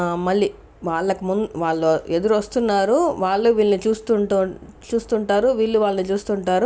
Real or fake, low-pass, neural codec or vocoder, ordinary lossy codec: real; none; none; none